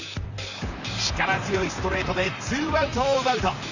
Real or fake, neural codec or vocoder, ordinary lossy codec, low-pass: fake; vocoder, 44.1 kHz, 128 mel bands, Pupu-Vocoder; none; 7.2 kHz